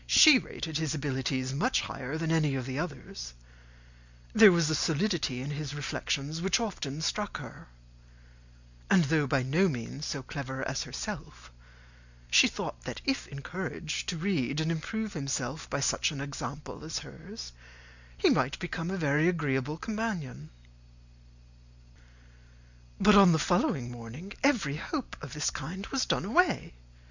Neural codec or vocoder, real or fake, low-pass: none; real; 7.2 kHz